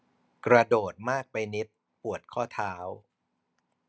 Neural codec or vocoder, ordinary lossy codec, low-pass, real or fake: none; none; none; real